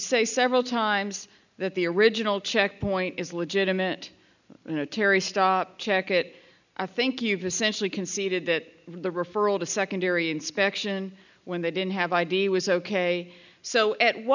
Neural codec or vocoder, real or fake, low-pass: none; real; 7.2 kHz